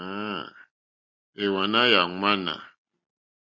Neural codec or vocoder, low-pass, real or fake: none; 7.2 kHz; real